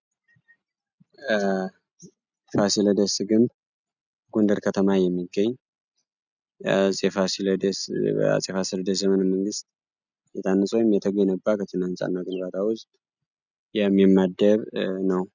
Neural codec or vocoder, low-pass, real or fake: none; 7.2 kHz; real